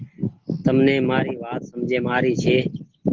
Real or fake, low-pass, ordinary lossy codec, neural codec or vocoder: real; 7.2 kHz; Opus, 16 kbps; none